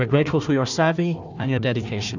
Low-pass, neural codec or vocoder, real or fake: 7.2 kHz; codec, 16 kHz, 1 kbps, FunCodec, trained on Chinese and English, 50 frames a second; fake